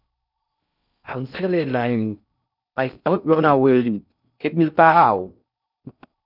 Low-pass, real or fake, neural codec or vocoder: 5.4 kHz; fake; codec, 16 kHz in and 24 kHz out, 0.6 kbps, FocalCodec, streaming, 4096 codes